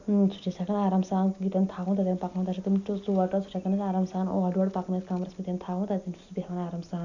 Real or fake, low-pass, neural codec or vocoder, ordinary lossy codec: real; 7.2 kHz; none; none